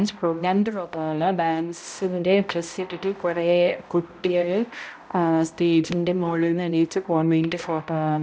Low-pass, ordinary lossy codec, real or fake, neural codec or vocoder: none; none; fake; codec, 16 kHz, 0.5 kbps, X-Codec, HuBERT features, trained on balanced general audio